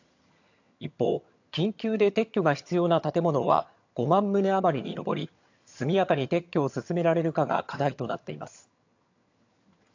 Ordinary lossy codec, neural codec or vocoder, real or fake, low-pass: AAC, 48 kbps; vocoder, 22.05 kHz, 80 mel bands, HiFi-GAN; fake; 7.2 kHz